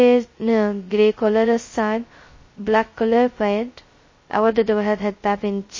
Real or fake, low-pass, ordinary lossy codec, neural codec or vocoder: fake; 7.2 kHz; MP3, 32 kbps; codec, 16 kHz, 0.2 kbps, FocalCodec